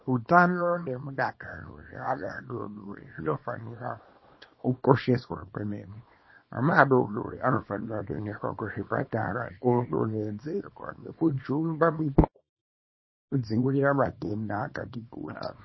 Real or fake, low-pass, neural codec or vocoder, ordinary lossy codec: fake; 7.2 kHz; codec, 24 kHz, 0.9 kbps, WavTokenizer, small release; MP3, 24 kbps